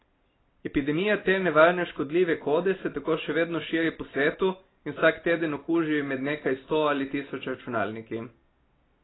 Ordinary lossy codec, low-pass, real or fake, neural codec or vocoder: AAC, 16 kbps; 7.2 kHz; real; none